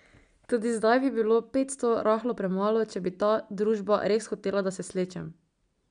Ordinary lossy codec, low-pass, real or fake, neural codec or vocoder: none; 9.9 kHz; real; none